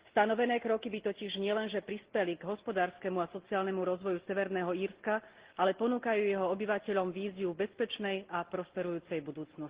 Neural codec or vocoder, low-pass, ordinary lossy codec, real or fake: none; 3.6 kHz; Opus, 16 kbps; real